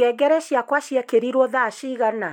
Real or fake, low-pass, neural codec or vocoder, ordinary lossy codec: real; 19.8 kHz; none; MP3, 96 kbps